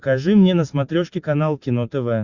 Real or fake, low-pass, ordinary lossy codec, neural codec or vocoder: real; 7.2 kHz; Opus, 64 kbps; none